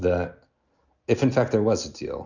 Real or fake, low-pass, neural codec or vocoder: real; 7.2 kHz; none